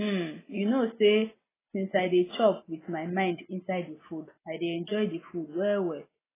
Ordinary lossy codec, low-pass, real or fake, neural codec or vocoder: AAC, 16 kbps; 3.6 kHz; real; none